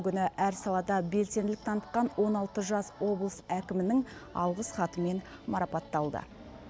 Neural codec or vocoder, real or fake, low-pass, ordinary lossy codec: none; real; none; none